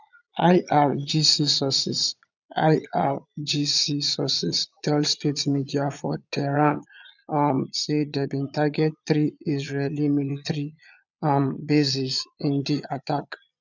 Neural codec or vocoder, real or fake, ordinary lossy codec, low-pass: vocoder, 22.05 kHz, 80 mel bands, Vocos; fake; none; 7.2 kHz